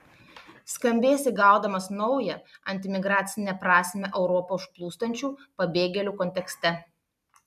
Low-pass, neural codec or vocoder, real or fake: 14.4 kHz; none; real